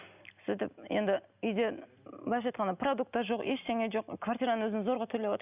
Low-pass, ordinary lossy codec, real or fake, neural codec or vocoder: 3.6 kHz; none; real; none